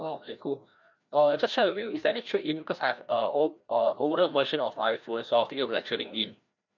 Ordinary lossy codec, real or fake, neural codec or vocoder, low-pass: none; fake; codec, 16 kHz, 1 kbps, FreqCodec, larger model; 7.2 kHz